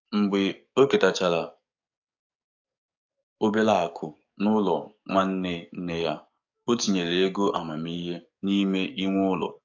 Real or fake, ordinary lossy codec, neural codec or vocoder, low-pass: fake; AAC, 48 kbps; codec, 44.1 kHz, 7.8 kbps, DAC; 7.2 kHz